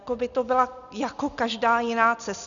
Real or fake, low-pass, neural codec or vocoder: real; 7.2 kHz; none